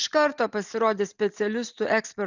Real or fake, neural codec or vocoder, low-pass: real; none; 7.2 kHz